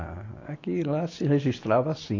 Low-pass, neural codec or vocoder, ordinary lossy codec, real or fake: 7.2 kHz; none; AAC, 32 kbps; real